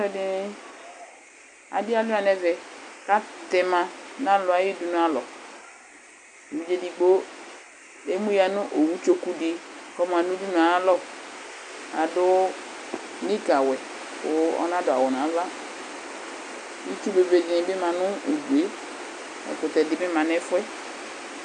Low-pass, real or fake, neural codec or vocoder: 10.8 kHz; real; none